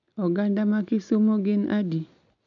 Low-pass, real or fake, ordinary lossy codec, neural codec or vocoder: 7.2 kHz; real; none; none